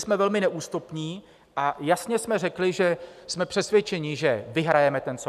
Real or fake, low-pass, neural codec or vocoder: real; 14.4 kHz; none